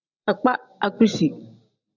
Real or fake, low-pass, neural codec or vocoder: real; 7.2 kHz; none